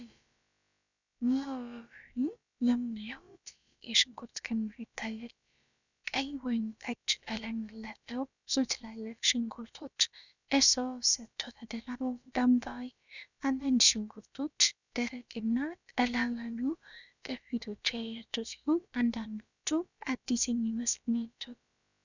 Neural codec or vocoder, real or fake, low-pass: codec, 16 kHz, about 1 kbps, DyCAST, with the encoder's durations; fake; 7.2 kHz